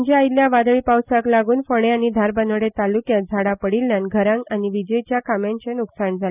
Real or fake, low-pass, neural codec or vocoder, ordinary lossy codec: real; 3.6 kHz; none; none